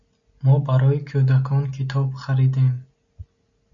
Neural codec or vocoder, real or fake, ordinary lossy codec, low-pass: none; real; MP3, 64 kbps; 7.2 kHz